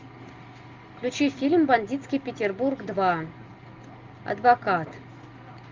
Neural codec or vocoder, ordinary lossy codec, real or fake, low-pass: none; Opus, 32 kbps; real; 7.2 kHz